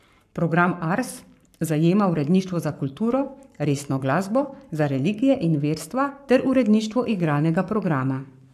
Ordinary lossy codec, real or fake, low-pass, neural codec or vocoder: AAC, 96 kbps; fake; 14.4 kHz; codec, 44.1 kHz, 7.8 kbps, Pupu-Codec